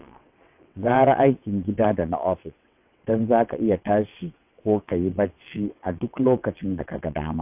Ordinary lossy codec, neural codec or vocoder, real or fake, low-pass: AAC, 32 kbps; vocoder, 22.05 kHz, 80 mel bands, WaveNeXt; fake; 3.6 kHz